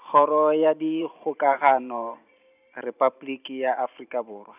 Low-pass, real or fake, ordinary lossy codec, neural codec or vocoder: 3.6 kHz; fake; none; vocoder, 44.1 kHz, 128 mel bands every 512 samples, BigVGAN v2